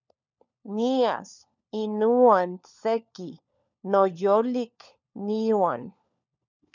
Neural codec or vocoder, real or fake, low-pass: codec, 16 kHz, 4 kbps, FunCodec, trained on LibriTTS, 50 frames a second; fake; 7.2 kHz